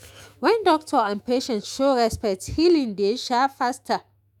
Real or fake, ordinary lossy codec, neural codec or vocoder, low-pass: fake; none; autoencoder, 48 kHz, 128 numbers a frame, DAC-VAE, trained on Japanese speech; 19.8 kHz